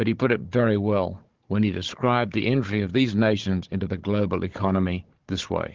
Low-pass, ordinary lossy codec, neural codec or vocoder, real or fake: 7.2 kHz; Opus, 16 kbps; codec, 16 kHz, 16 kbps, FunCodec, trained on Chinese and English, 50 frames a second; fake